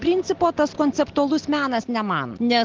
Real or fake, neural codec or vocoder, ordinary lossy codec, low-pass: real; none; Opus, 16 kbps; 7.2 kHz